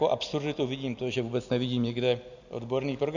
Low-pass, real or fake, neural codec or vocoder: 7.2 kHz; real; none